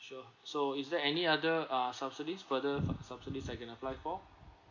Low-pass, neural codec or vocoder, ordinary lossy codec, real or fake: 7.2 kHz; none; none; real